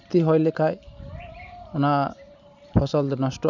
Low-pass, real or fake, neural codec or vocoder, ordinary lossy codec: 7.2 kHz; real; none; none